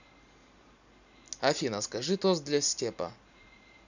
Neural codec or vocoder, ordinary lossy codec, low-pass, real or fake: none; none; 7.2 kHz; real